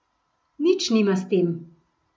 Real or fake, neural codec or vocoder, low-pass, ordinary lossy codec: real; none; none; none